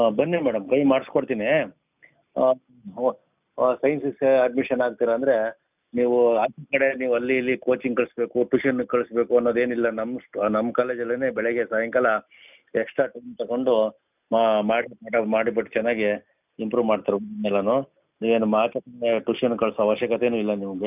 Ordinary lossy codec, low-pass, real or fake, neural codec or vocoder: none; 3.6 kHz; real; none